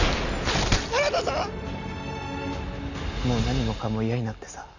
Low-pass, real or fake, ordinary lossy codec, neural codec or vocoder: 7.2 kHz; real; none; none